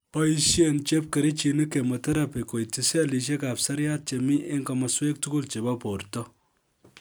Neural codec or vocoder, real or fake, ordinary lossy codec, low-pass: none; real; none; none